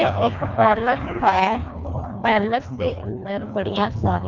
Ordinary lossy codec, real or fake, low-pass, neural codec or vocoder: none; fake; 7.2 kHz; codec, 24 kHz, 1.5 kbps, HILCodec